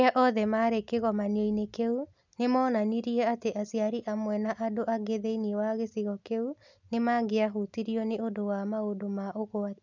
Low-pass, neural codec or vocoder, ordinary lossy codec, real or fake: 7.2 kHz; none; none; real